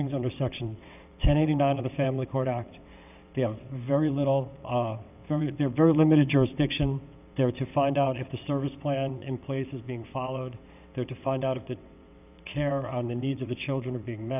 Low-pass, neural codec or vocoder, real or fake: 3.6 kHz; vocoder, 22.05 kHz, 80 mel bands, WaveNeXt; fake